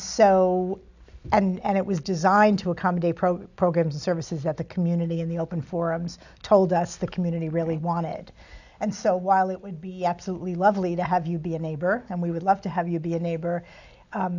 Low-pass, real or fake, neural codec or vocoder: 7.2 kHz; real; none